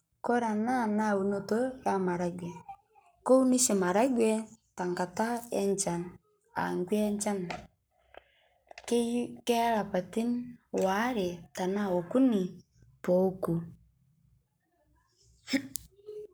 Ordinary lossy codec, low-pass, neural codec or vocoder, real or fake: none; none; codec, 44.1 kHz, 7.8 kbps, Pupu-Codec; fake